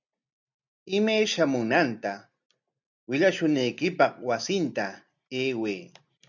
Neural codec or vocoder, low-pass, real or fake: none; 7.2 kHz; real